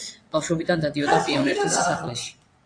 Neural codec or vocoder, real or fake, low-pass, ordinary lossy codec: vocoder, 22.05 kHz, 80 mel bands, WaveNeXt; fake; 9.9 kHz; AAC, 48 kbps